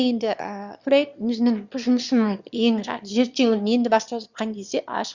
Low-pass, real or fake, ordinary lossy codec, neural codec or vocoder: 7.2 kHz; fake; Opus, 64 kbps; autoencoder, 22.05 kHz, a latent of 192 numbers a frame, VITS, trained on one speaker